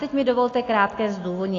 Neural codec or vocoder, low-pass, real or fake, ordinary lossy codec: none; 7.2 kHz; real; AAC, 32 kbps